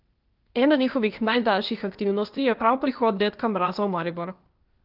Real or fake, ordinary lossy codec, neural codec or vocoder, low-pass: fake; Opus, 32 kbps; codec, 16 kHz, 0.8 kbps, ZipCodec; 5.4 kHz